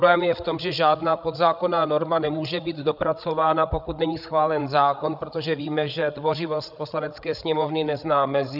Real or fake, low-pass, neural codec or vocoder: fake; 5.4 kHz; codec, 16 kHz, 8 kbps, FreqCodec, larger model